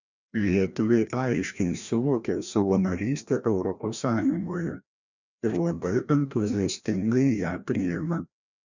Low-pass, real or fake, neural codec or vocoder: 7.2 kHz; fake; codec, 16 kHz, 1 kbps, FreqCodec, larger model